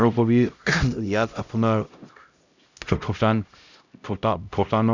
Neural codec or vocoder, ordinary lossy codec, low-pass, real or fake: codec, 16 kHz, 0.5 kbps, X-Codec, HuBERT features, trained on LibriSpeech; none; 7.2 kHz; fake